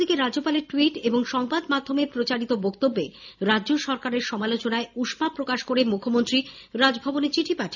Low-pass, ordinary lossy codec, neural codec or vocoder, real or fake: 7.2 kHz; none; none; real